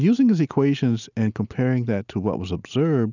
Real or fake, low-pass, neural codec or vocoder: real; 7.2 kHz; none